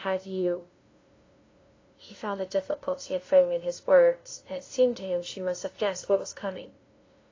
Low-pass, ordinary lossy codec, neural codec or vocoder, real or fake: 7.2 kHz; AAC, 32 kbps; codec, 16 kHz, 0.5 kbps, FunCodec, trained on LibriTTS, 25 frames a second; fake